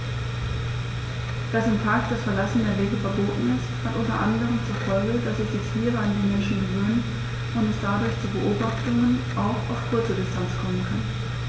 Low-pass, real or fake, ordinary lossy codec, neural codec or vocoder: none; real; none; none